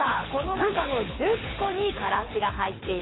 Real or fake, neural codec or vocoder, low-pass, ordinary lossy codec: fake; codec, 24 kHz, 6 kbps, HILCodec; 7.2 kHz; AAC, 16 kbps